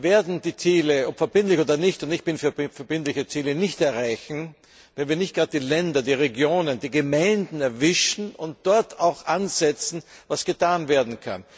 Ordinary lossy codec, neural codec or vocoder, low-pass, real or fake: none; none; none; real